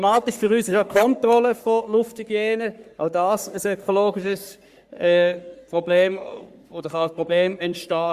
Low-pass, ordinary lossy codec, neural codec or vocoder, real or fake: 14.4 kHz; Opus, 64 kbps; codec, 44.1 kHz, 3.4 kbps, Pupu-Codec; fake